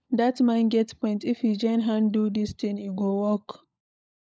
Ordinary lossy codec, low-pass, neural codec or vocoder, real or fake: none; none; codec, 16 kHz, 16 kbps, FunCodec, trained on LibriTTS, 50 frames a second; fake